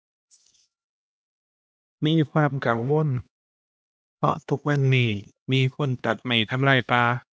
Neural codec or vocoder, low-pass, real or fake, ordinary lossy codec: codec, 16 kHz, 1 kbps, X-Codec, HuBERT features, trained on LibriSpeech; none; fake; none